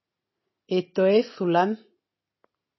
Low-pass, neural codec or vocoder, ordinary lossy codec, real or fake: 7.2 kHz; none; MP3, 24 kbps; real